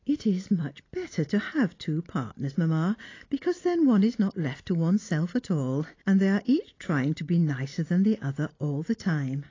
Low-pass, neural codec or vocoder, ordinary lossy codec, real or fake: 7.2 kHz; none; AAC, 32 kbps; real